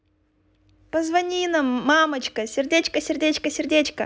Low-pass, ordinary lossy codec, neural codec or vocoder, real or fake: none; none; none; real